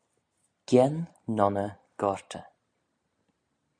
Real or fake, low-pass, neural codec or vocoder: real; 9.9 kHz; none